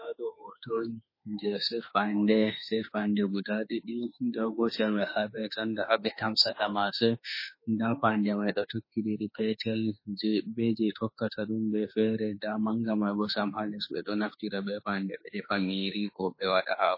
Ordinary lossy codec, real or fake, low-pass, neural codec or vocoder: MP3, 24 kbps; fake; 5.4 kHz; autoencoder, 48 kHz, 32 numbers a frame, DAC-VAE, trained on Japanese speech